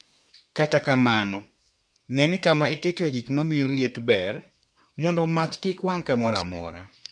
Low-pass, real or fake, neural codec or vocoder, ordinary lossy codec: 9.9 kHz; fake; codec, 24 kHz, 1 kbps, SNAC; none